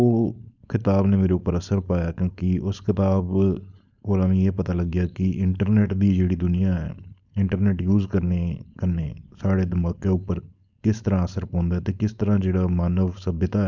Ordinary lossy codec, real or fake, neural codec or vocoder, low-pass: none; fake; codec, 16 kHz, 4.8 kbps, FACodec; 7.2 kHz